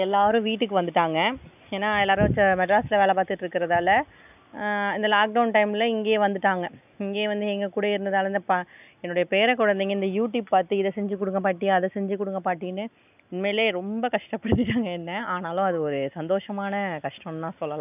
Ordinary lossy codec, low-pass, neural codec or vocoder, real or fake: none; 3.6 kHz; none; real